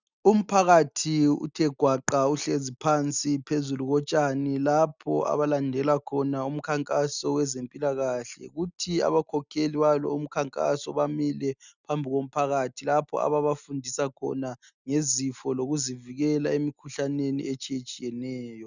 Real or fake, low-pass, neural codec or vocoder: real; 7.2 kHz; none